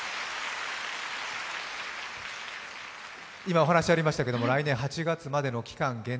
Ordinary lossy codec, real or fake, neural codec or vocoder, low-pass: none; real; none; none